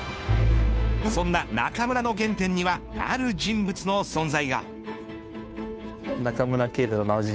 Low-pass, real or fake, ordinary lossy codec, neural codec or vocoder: none; fake; none; codec, 16 kHz, 2 kbps, FunCodec, trained on Chinese and English, 25 frames a second